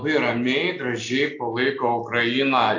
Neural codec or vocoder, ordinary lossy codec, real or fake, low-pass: none; AAC, 48 kbps; real; 7.2 kHz